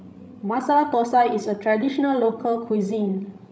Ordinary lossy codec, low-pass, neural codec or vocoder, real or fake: none; none; codec, 16 kHz, 16 kbps, FreqCodec, larger model; fake